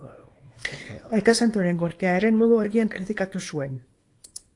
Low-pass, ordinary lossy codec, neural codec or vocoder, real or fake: 10.8 kHz; AAC, 48 kbps; codec, 24 kHz, 0.9 kbps, WavTokenizer, small release; fake